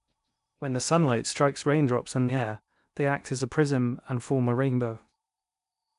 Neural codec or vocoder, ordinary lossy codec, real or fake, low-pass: codec, 16 kHz in and 24 kHz out, 0.8 kbps, FocalCodec, streaming, 65536 codes; none; fake; 10.8 kHz